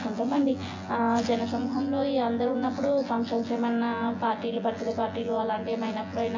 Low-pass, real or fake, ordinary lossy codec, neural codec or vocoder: 7.2 kHz; fake; MP3, 64 kbps; vocoder, 24 kHz, 100 mel bands, Vocos